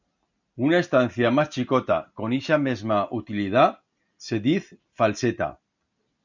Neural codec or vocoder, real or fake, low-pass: none; real; 7.2 kHz